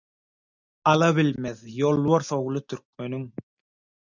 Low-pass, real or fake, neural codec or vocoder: 7.2 kHz; real; none